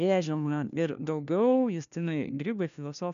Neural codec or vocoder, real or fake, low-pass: codec, 16 kHz, 1 kbps, FunCodec, trained on LibriTTS, 50 frames a second; fake; 7.2 kHz